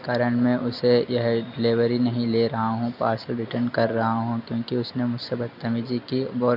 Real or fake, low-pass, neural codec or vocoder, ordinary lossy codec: real; 5.4 kHz; none; none